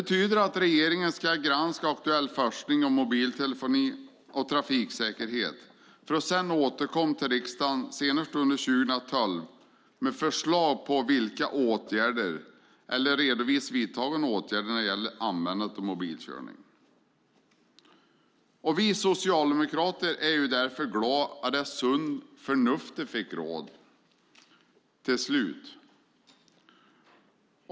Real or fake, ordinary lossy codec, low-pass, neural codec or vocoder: real; none; none; none